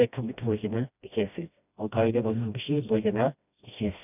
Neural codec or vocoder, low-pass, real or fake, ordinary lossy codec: codec, 16 kHz, 1 kbps, FreqCodec, smaller model; 3.6 kHz; fake; none